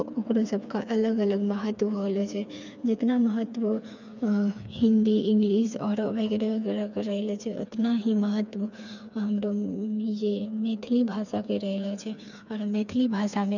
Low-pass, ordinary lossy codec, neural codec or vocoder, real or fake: 7.2 kHz; none; codec, 16 kHz, 4 kbps, FreqCodec, smaller model; fake